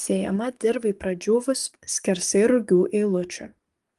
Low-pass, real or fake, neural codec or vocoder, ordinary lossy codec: 14.4 kHz; fake; codec, 44.1 kHz, 7.8 kbps, DAC; Opus, 24 kbps